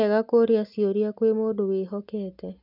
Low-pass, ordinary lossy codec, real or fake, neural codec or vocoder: 5.4 kHz; none; real; none